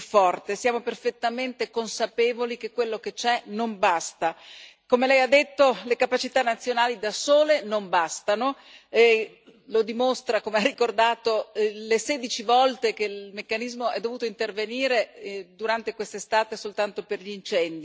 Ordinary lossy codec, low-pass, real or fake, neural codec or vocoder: none; none; real; none